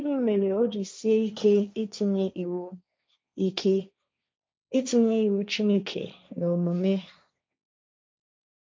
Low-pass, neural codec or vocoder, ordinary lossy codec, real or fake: none; codec, 16 kHz, 1.1 kbps, Voila-Tokenizer; none; fake